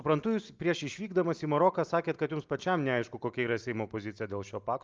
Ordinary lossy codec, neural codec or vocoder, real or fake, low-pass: Opus, 24 kbps; none; real; 7.2 kHz